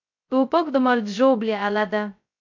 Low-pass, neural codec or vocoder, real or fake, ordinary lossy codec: 7.2 kHz; codec, 16 kHz, 0.2 kbps, FocalCodec; fake; MP3, 48 kbps